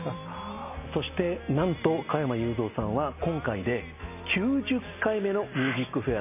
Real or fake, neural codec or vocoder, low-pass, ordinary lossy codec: real; none; 3.6 kHz; none